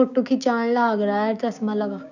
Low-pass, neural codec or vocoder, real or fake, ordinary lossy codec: 7.2 kHz; codec, 16 kHz, 6 kbps, DAC; fake; none